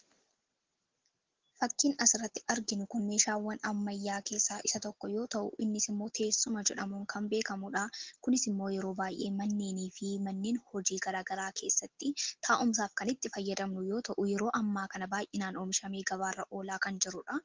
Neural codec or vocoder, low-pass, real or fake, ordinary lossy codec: none; 7.2 kHz; real; Opus, 16 kbps